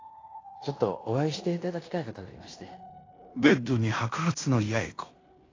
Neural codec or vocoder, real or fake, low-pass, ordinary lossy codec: codec, 16 kHz in and 24 kHz out, 0.9 kbps, LongCat-Audio-Codec, fine tuned four codebook decoder; fake; 7.2 kHz; AAC, 32 kbps